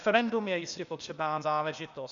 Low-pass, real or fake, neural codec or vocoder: 7.2 kHz; fake; codec, 16 kHz, 0.8 kbps, ZipCodec